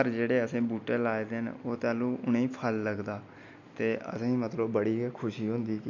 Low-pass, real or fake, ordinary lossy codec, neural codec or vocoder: 7.2 kHz; real; none; none